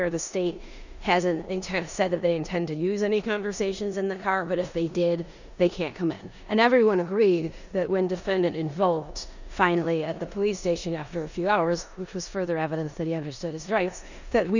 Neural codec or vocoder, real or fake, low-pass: codec, 16 kHz in and 24 kHz out, 0.9 kbps, LongCat-Audio-Codec, four codebook decoder; fake; 7.2 kHz